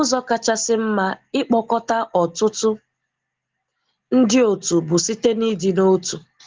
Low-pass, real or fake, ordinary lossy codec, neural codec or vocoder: 7.2 kHz; real; Opus, 16 kbps; none